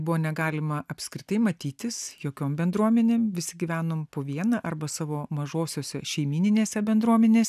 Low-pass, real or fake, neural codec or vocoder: 14.4 kHz; real; none